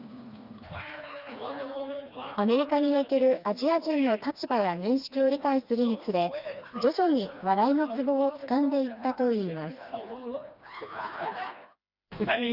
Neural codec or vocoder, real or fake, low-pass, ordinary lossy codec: codec, 16 kHz, 2 kbps, FreqCodec, smaller model; fake; 5.4 kHz; Opus, 64 kbps